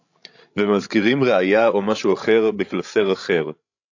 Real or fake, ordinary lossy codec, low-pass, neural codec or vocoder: fake; AAC, 48 kbps; 7.2 kHz; codec, 16 kHz, 16 kbps, FreqCodec, larger model